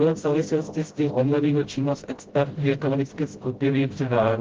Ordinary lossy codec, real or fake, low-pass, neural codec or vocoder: Opus, 16 kbps; fake; 7.2 kHz; codec, 16 kHz, 0.5 kbps, FreqCodec, smaller model